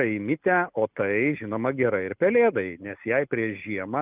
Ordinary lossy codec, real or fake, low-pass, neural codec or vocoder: Opus, 24 kbps; real; 3.6 kHz; none